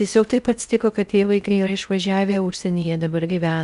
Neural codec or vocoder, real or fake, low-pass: codec, 16 kHz in and 24 kHz out, 0.6 kbps, FocalCodec, streaming, 4096 codes; fake; 10.8 kHz